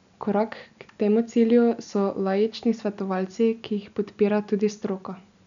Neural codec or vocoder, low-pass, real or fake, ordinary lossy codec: none; 7.2 kHz; real; none